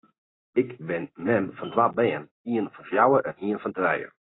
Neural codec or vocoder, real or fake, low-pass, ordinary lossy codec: none; real; 7.2 kHz; AAC, 16 kbps